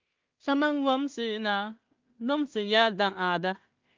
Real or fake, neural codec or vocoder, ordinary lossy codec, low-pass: fake; codec, 16 kHz in and 24 kHz out, 0.4 kbps, LongCat-Audio-Codec, two codebook decoder; Opus, 24 kbps; 7.2 kHz